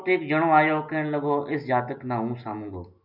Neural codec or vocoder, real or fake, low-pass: none; real; 5.4 kHz